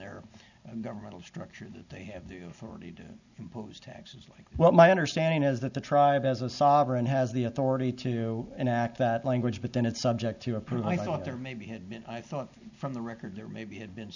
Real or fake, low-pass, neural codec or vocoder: real; 7.2 kHz; none